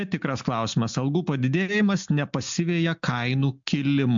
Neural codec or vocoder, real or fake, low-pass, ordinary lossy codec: none; real; 7.2 kHz; MP3, 64 kbps